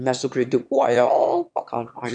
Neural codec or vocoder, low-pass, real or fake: autoencoder, 22.05 kHz, a latent of 192 numbers a frame, VITS, trained on one speaker; 9.9 kHz; fake